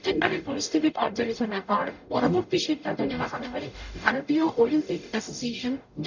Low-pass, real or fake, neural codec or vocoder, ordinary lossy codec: 7.2 kHz; fake; codec, 44.1 kHz, 0.9 kbps, DAC; none